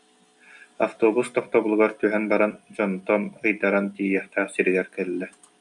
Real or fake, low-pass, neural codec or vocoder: real; 10.8 kHz; none